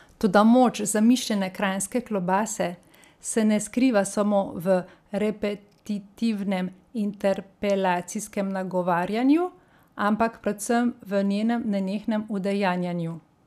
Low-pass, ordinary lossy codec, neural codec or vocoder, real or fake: 14.4 kHz; none; none; real